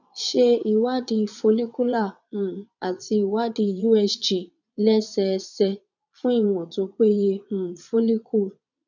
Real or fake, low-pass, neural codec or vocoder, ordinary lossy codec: fake; 7.2 kHz; vocoder, 22.05 kHz, 80 mel bands, Vocos; none